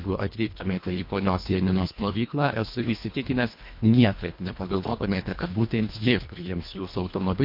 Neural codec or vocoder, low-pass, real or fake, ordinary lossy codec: codec, 24 kHz, 1.5 kbps, HILCodec; 5.4 kHz; fake; MP3, 32 kbps